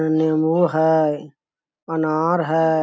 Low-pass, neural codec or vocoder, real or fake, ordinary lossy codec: none; none; real; none